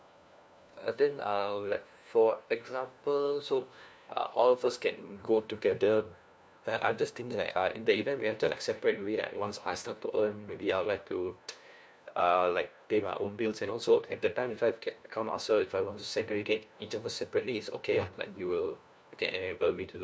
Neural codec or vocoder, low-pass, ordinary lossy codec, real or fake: codec, 16 kHz, 1 kbps, FunCodec, trained on LibriTTS, 50 frames a second; none; none; fake